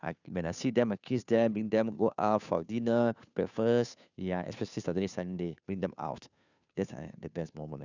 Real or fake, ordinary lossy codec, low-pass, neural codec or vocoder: fake; none; 7.2 kHz; codec, 16 kHz, 2 kbps, FunCodec, trained on Chinese and English, 25 frames a second